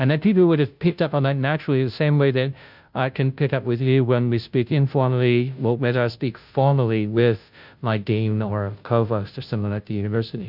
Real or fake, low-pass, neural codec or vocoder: fake; 5.4 kHz; codec, 16 kHz, 0.5 kbps, FunCodec, trained on Chinese and English, 25 frames a second